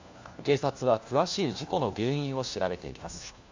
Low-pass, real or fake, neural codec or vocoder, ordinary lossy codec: 7.2 kHz; fake; codec, 16 kHz, 1 kbps, FunCodec, trained on LibriTTS, 50 frames a second; AAC, 48 kbps